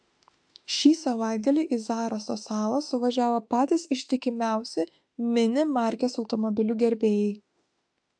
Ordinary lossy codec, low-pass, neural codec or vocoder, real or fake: AAC, 64 kbps; 9.9 kHz; autoencoder, 48 kHz, 32 numbers a frame, DAC-VAE, trained on Japanese speech; fake